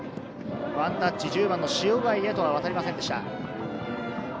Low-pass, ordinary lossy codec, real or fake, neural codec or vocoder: none; none; real; none